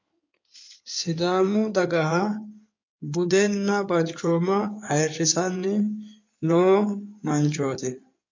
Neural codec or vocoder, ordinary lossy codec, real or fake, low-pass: codec, 16 kHz in and 24 kHz out, 2.2 kbps, FireRedTTS-2 codec; MP3, 48 kbps; fake; 7.2 kHz